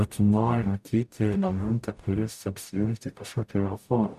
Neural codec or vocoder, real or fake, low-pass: codec, 44.1 kHz, 0.9 kbps, DAC; fake; 14.4 kHz